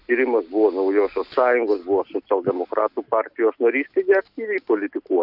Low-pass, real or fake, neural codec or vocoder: 5.4 kHz; real; none